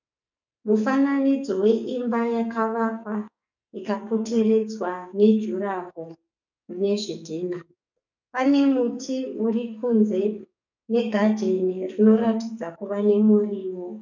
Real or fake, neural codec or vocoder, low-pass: fake; codec, 44.1 kHz, 2.6 kbps, SNAC; 7.2 kHz